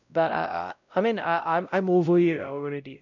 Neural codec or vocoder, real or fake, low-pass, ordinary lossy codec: codec, 16 kHz, 0.5 kbps, X-Codec, WavLM features, trained on Multilingual LibriSpeech; fake; 7.2 kHz; none